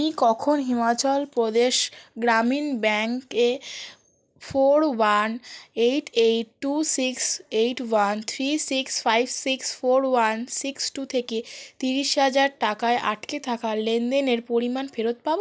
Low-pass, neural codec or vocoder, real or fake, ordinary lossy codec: none; none; real; none